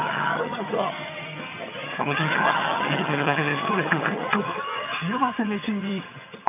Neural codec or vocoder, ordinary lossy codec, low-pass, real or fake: vocoder, 22.05 kHz, 80 mel bands, HiFi-GAN; none; 3.6 kHz; fake